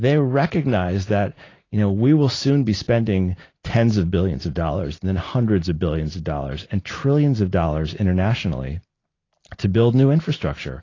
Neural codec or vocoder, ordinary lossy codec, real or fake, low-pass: none; AAC, 32 kbps; real; 7.2 kHz